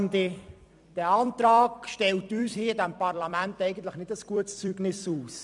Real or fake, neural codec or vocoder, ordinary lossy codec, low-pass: fake; vocoder, 44.1 kHz, 128 mel bands every 256 samples, BigVGAN v2; MP3, 96 kbps; 10.8 kHz